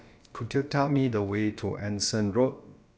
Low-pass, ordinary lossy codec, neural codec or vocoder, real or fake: none; none; codec, 16 kHz, about 1 kbps, DyCAST, with the encoder's durations; fake